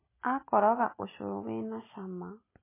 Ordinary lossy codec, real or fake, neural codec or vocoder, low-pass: MP3, 16 kbps; real; none; 3.6 kHz